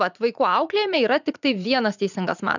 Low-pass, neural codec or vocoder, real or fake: 7.2 kHz; vocoder, 44.1 kHz, 80 mel bands, Vocos; fake